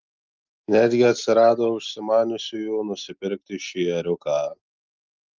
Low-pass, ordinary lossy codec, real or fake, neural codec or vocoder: 7.2 kHz; Opus, 32 kbps; real; none